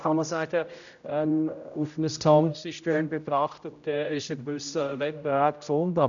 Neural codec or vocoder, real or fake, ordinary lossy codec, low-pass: codec, 16 kHz, 0.5 kbps, X-Codec, HuBERT features, trained on general audio; fake; none; 7.2 kHz